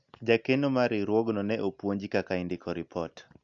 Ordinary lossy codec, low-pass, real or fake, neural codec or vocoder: AAC, 64 kbps; 7.2 kHz; real; none